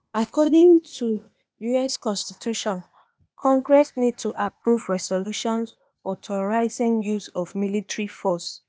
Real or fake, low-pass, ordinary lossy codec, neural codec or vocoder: fake; none; none; codec, 16 kHz, 0.8 kbps, ZipCodec